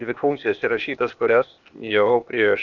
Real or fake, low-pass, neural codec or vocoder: fake; 7.2 kHz; codec, 16 kHz, 0.8 kbps, ZipCodec